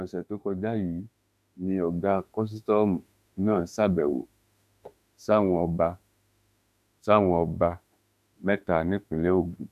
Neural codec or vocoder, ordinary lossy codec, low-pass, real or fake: autoencoder, 48 kHz, 32 numbers a frame, DAC-VAE, trained on Japanese speech; none; 14.4 kHz; fake